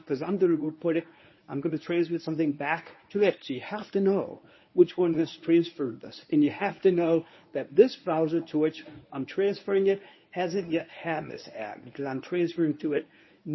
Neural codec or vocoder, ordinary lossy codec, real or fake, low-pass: codec, 24 kHz, 0.9 kbps, WavTokenizer, medium speech release version 1; MP3, 24 kbps; fake; 7.2 kHz